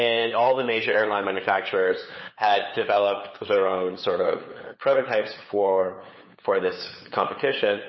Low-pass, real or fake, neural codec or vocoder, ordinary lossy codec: 7.2 kHz; fake; codec, 16 kHz, 8 kbps, FunCodec, trained on LibriTTS, 25 frames a second; MP3, 24 kbps